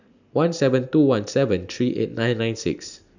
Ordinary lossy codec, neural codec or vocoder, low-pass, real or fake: none; none; 7.2 kHz; real